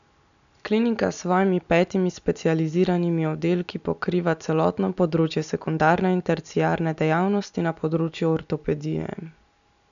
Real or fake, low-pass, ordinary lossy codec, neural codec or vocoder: real; 7.2 kHz; none; none